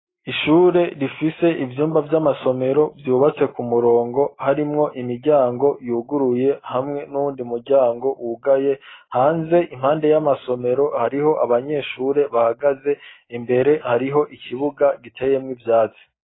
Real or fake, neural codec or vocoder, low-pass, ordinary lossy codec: real; none; 7.2 kHz; AAC, 16 kbps